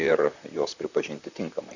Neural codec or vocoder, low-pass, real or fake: vocoder, 44.1 kHz, 128 mel bands, Pupu-Vocoder; 7.2 kHz; fake